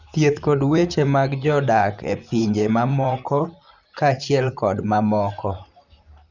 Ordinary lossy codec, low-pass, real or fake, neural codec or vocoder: none; 7.2 kHz; fake; vocoder, 44.1 kHz, 128 mel bands, Pupu-Vocoder